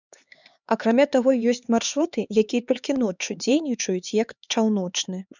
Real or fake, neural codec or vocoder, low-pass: fake; codec, 16 kHz, 4 kbps, X-Codec, HuBERT features, trained on LibriSpeech; 7.2 kHz